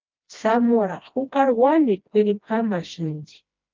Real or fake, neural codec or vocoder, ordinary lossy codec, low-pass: fake; codec, 16 kHz, 1 kbps, FreqCodec, smaller model; Opus, 24 kbps; 7.2 kHz